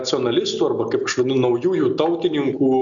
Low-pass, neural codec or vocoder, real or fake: 7.2 kHz; none; real